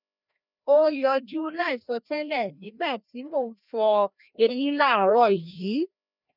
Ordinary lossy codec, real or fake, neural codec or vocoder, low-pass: none; fake; codec, 16 kHz, 1 kbps, FreqCodec, larger model; 5.4 kHz